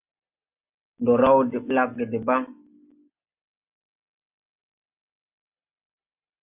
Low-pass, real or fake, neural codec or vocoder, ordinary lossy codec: 3.6 kHz; real; none; AAC, 32 kbps